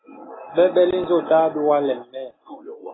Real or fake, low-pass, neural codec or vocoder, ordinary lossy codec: real; 7.2 kHz; none; AAC, 16 kbps